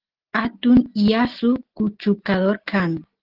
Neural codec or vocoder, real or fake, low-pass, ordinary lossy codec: none; real; 5.4 kHz; Opus, 16 kbps